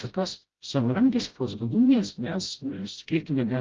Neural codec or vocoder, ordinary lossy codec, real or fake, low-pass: codec, 16 kHz, 0.5 kbps, FreqCodec, smaller model; Opus, 32 kbps; fake; 7.2 kHz